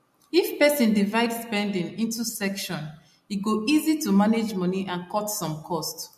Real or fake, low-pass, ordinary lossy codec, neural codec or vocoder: real; 14.4 kHz; MP3, 64 kbps; none